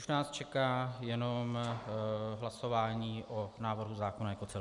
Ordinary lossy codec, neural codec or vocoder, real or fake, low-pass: MP3, 96 kbps; none; real; 10.8 kHz